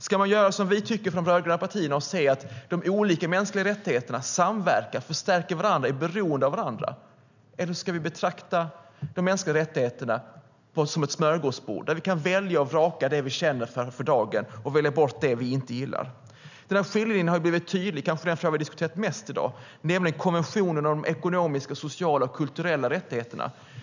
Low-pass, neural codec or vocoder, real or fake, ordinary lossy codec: 7.2 kHz; none; real; none